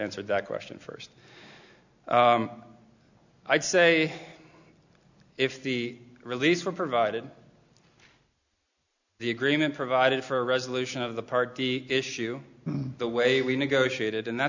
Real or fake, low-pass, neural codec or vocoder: real; 7.2 kHz; none